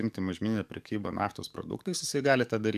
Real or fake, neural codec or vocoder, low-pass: fake; codec, 44.1 kHz, 7.8 kbps, DAC; 14.4 kHz